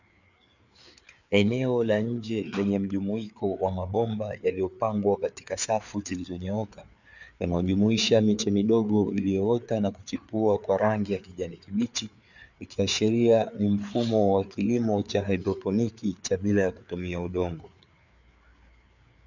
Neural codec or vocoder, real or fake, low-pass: codec, 16 kHz, 4 kbps, FreqCodec, larger model; fake; 7.2 kHz